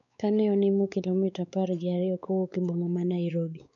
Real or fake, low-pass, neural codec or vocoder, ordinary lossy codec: fake; 7.2 kHz; codec, 16 kHz, 4 kbps, X-Codec, WavLM features, trained on Multilingual LibriSpeech; none